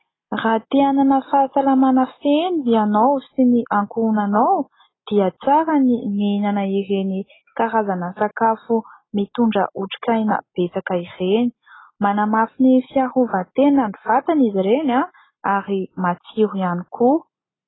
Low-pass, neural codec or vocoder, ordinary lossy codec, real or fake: 7.2 kHz; none; AAC, 16 kbps; real